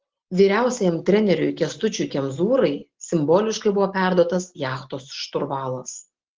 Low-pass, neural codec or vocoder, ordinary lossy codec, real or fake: 7.2 kHz; none; Opus, 16 kbps; real